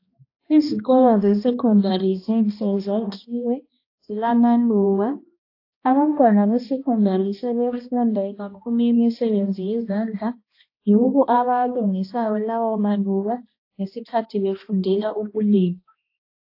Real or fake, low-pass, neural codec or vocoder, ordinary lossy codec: fake; 5.4 kHz; codec, 16 kHz, 1 kbps, X-Codec, HuBERT features, trained on balanced general audio; AAC, 32 kbps